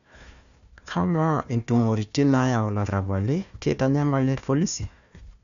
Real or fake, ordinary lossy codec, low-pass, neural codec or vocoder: fake; none; 7.2 kHz; codec, 16 kHz, 1 kbps, FunCodec, trained on Chinese and English, 50 frames a second